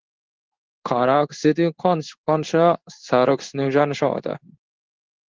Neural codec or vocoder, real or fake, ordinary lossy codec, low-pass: codec, 16 kHz in and 24 kHz out, 1 kbps, XY-Tokenizer; fake; Opus, 16 kbps; 7.2 kHz